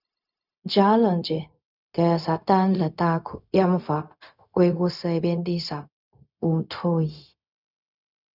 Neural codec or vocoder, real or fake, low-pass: codec, 16 kHz, 0.4 kbps, LongCat-Audio-Codec; fake; 5.4 kHz